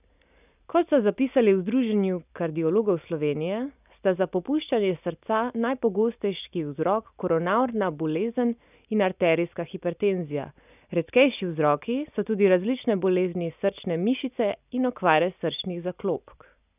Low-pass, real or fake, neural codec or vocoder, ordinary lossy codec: 3.6 kHz; real; none; none